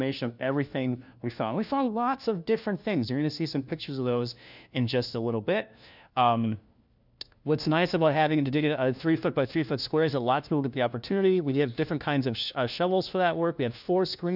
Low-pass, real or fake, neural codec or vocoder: 5.4 kHz; fake; codec, 16 kHz, 1 kbps, FunCodec, trained on LibriTTS, 50 frames a second